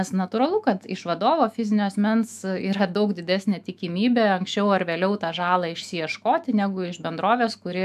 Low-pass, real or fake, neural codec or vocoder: 14.4 kHz; fake; autoencoder, 48 kHz, 128 numbers a frame, DAC-VAE, trained on Japanese speech